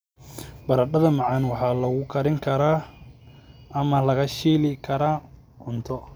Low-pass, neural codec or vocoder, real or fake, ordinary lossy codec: none; none; real; none